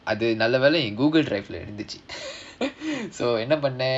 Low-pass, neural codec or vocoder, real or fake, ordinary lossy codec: 9.9 kHz; none; real; none